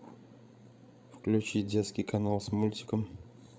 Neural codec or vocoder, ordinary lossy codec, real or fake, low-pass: codec, 16 kHz, 16 kbps, FreqCodec, larger model; none; fake; none